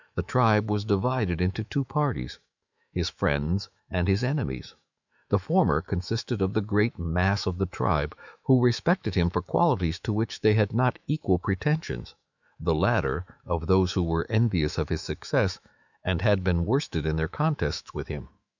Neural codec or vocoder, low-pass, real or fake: autoencoder, 48 kHz, 128 numbers a frame, DAC-VAE, trained on Japanese speech; 7.2 kHz; fake